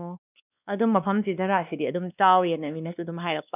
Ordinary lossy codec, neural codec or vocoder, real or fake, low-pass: none; codec, 16 kHz, 2 kbps, X-Codec, WavLM features, trained on Multilingual LibriSpeech; fake; 3.6 kHz